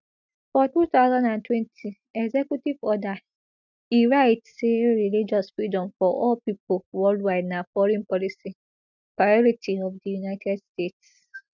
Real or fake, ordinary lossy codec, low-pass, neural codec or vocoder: real; none; 7.2 kHz; none